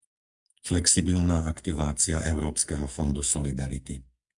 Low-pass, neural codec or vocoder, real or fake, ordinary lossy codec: 10.8 kHz; codec, 44.1 kHz, 2.6 kbps, SNAC; fake; Opus, 64 kbps